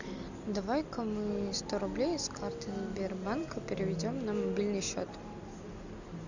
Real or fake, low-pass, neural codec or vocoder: real; 7.2 kHz; none